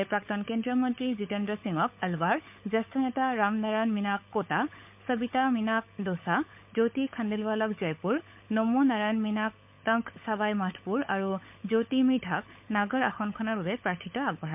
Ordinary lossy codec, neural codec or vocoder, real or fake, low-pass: MP3, 32 kbps; codec, 16 kHz, 16 kbps, FunCodec, trained on LibriTTS, 50 frames a second; fake; 3.6 kHz